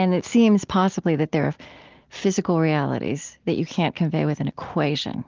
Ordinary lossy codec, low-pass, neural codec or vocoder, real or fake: Opus, 32 kbps; 7.2 kHz; none; real